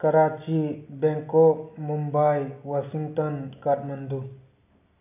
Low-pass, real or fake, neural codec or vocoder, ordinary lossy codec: 3.6 kHz; real; none; none